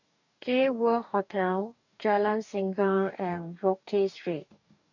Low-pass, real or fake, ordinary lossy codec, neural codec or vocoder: 7.2 kHz; fake; none; codec, 44.1 kHz, 2.6 kbps, DAC